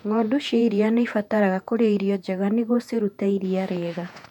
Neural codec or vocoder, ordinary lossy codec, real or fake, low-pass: vocoder, 48 kHz, 128 mel bands, Vocos; none; fake; 19.8 kHz